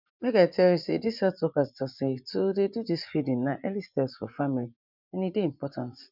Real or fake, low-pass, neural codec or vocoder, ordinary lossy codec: fake; 5.4 kHz; vocoder, 24 kHz, 100 mel bands, Vocos; none